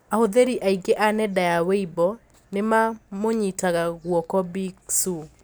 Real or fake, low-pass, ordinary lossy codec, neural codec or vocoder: real; none; none; none